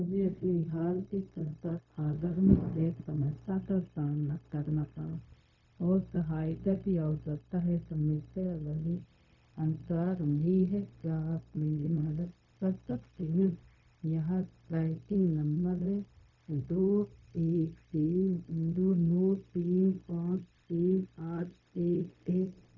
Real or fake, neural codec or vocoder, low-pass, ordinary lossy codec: fake; codec, 16 kHz, 0.4 kbps, LongCat-Audio-Codec; 7.2 kHz; none